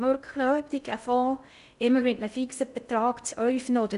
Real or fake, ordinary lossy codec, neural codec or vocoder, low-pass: fake; none; codec, 16 kHz in and 24 kHz out, 0.6 kbps, FocalCodec, streaming, 2048 codes; 10.8 kHz